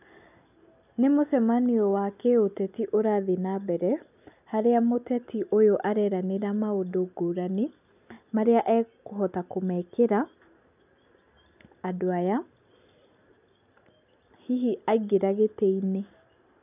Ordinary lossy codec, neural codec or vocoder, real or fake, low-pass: none; none; real; 3.6 kHz